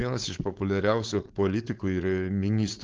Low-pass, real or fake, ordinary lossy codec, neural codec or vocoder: 7.2 kHz; fake; Opus, 16 kbps; codec, 16 kHz, 4.8 kbps, FACodec